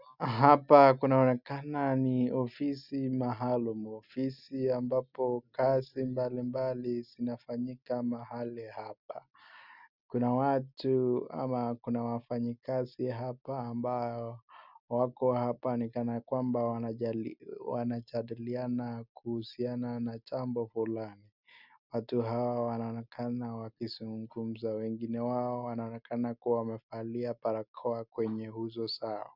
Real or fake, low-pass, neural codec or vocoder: real; 5.4 kHz; none